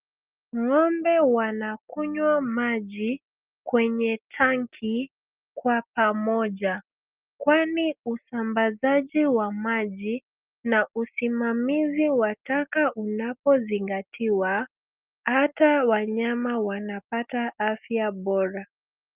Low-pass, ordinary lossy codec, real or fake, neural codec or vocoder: 3.6 kHz; Opus, 32 kbps; real; none